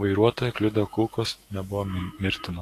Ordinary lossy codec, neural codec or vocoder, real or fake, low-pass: AAC, 48 kbps; autoencoder, 48 kHz, 128 numbers a frame, DAC-VAE, trained on Japanese speech; fake; 14.4 kHz